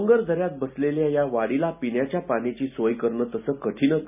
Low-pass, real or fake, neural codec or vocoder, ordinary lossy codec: 3.6 kHz; real; none; none